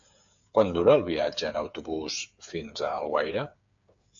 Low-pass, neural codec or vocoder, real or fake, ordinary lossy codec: 7.2 kHz; codec, 16 kHz, 8 kbps, FreqCodec, smaller model; fake; AAC, 64 kbps